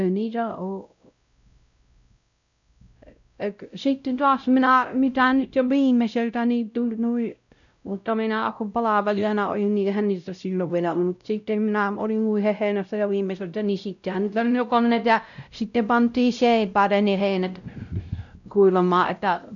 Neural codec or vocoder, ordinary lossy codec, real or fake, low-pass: codec, 16 kHz, 0.5 kbps, X-Codec, WavLM features, trained on Multilingual LibriSpeech; none; fake; 7.2 kHz